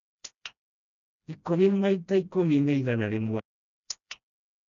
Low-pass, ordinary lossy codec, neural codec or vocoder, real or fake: 7.2 kHz; none; codec, 16 kHz, 1 kbps, FreqCodec, smaller model; fake